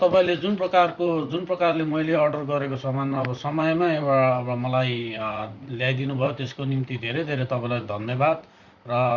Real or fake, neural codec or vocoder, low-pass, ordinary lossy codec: fake; vocoder, 44.1 kHz, 128 mel bands, Pupu-Vocoder; 7.2 kHz; Opus, 64 kbps